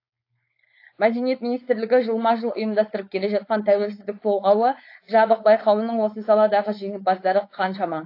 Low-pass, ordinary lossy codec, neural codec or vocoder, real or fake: 5.4 kHz; AAC, 32 kbps; codec, 16 kHz, 4.8 kbps, FACodec; fake